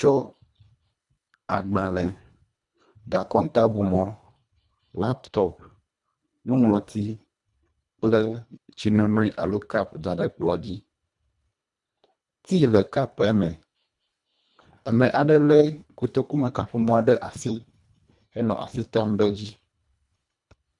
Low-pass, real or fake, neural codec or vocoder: 10.8 kHz; fake; codec, 24 kHz, 1.5 kbps, HILCodec